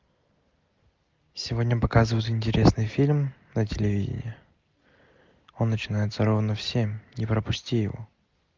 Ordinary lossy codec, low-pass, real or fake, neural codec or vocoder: Opus, 32 kbps; 7.2 kHz; real; none